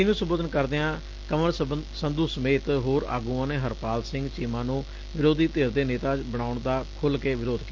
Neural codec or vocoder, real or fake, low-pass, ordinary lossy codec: none; real; 7.2 kHz; Opus, 24 kbps